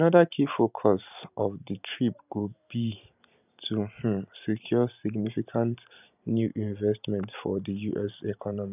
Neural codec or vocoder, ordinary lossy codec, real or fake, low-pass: vocoder, 44.1 kHz, 128 mel bands every 512 samples, BigVGAN v2; none; fake; 3.6 kHz